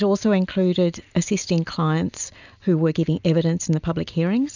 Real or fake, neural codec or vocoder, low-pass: real; none; 7.2 kHz